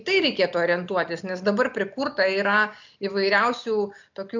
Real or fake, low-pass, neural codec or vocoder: real; 7.2 kHz; none